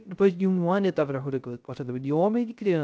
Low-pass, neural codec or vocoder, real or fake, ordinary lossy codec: none; codec, 16 kHz, 0.3 kbps, FocalCodec; fake; none